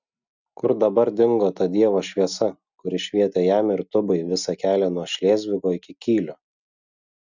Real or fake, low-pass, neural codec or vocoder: real; 7.2 kHz; none